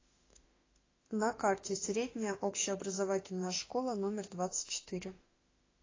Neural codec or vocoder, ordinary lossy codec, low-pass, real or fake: autoencoder, 48 kHz, 32 numbers a frame, DAC-VAE, trained on Japanese speech; AAC, 32 kbps; 7.2 kHz; fake